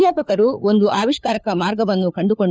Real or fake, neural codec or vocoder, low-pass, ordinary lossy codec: fake; codec, 16 kHz, 4 kbps, FunCodec, trained on LibriTTS, 50 frames a second; none; none